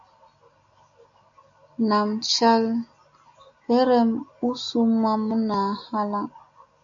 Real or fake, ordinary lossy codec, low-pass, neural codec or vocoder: real; MP3, 64 kbps; 7.2 kHz; none